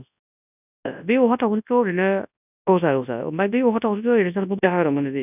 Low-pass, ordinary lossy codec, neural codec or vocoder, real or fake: 3.6 kHz; none; codec, 24 kHz, 0.9 kbps, WavTokenizer, large speech release; fake